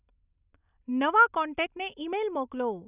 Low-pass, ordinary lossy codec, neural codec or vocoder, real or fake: 3.6 kHz; none; none; real